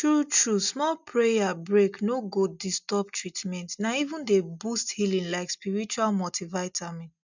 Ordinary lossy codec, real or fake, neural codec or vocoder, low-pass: none; real; none; 7.2 kHz